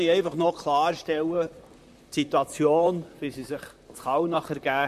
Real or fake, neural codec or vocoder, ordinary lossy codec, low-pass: fake; vocoder, 44.1 kHz, 128 mel bands, Pupu-Vocoder; MP3, 64 kbps; 14.4 kHz